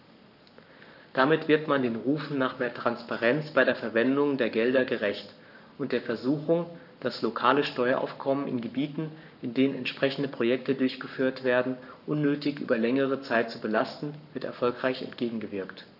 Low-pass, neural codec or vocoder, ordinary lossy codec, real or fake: 5.4 kHz; codec, 44.1 kHz, 7.8 kbps, Pupu-Codec; none; fake